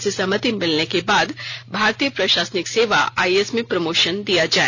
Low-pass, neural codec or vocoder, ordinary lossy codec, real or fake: 7.2 kHz; none; none; real